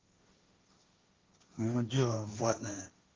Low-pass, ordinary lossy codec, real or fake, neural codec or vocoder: 7.2 kHz; Opus, 32 kbps; fake; codec, 16 kHz, 1.1 kbps, Voila-Tokenizer